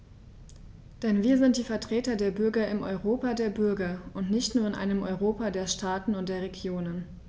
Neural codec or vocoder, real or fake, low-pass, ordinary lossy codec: none; real; none; none